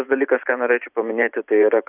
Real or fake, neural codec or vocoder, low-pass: fake; vocoder, 44.1 kHz, 128 mel bands every 512 samples, BigVGAN v2; 3.6 kHz